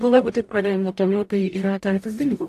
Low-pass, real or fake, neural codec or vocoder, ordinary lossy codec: 14.4 kHz; fake; codec, 44.1 kHz, 0.9 kbps, DAC; AAC, 64 kbps